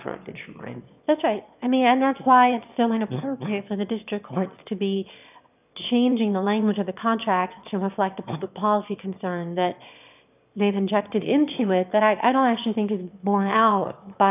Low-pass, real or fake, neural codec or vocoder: 3.6 kHz; fake; autoencoder, 22.05 kHz, a latent of 192 numbers a frame, VITS, trained on one speaker